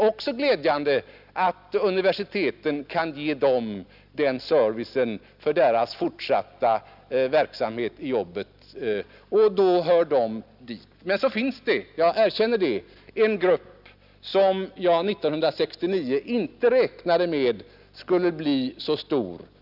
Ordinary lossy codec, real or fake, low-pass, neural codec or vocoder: none; real; 5.4 kHz; none